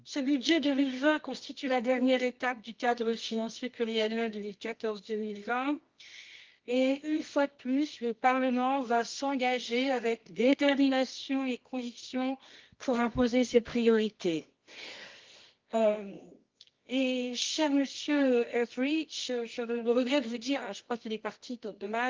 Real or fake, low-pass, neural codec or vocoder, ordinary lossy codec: fake; 7.2 kHz; codec, 24 kHz, 0.9 kbps, WavTokenizer, medium music audio release; Opus, 32 kbps